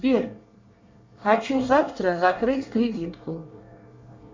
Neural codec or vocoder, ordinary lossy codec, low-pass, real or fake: codec, 24 kHz, 1 kbps, SNAC; MP3, 64 kbps; 7.2 kHz; fake